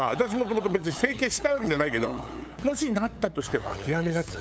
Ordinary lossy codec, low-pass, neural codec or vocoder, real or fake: none; none; codec, 16 kHz, 8 kbps, FunCodec, trained on LibriTTS, 25 frames a second; fake